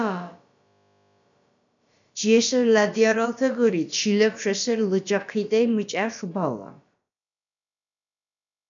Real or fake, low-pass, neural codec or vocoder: fake; 7.2 kHz; codec, 16 kHz, about 1 kbps, DyCAST, with the encoder's durations